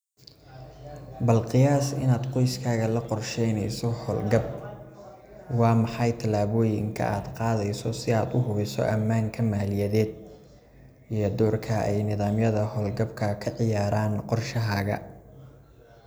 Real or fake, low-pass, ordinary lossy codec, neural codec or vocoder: real; none; none; none